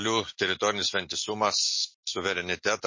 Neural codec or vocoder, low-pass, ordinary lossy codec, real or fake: none; 7.2 kHz; MP3, 32 kbps; real